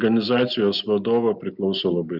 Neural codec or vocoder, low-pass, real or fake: none; 5.4 kHz; real